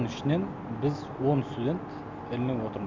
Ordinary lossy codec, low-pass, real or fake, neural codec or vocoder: MP3, 64 kbps; 7.2 kHz; real; none